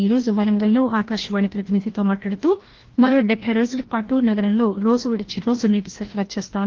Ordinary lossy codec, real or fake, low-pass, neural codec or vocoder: Opus, 16 kbps; fake; 7.2 kHz; codec, 16 kHz, 1 kbps, FreqCodec, larger model